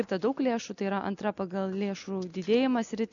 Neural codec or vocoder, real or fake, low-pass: none; real; 7.2 kHz